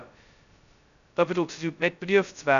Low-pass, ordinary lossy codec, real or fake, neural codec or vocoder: 7.2 kHz; none; fake; codec, 16 kHz, 0.2 kbps, FocalCodec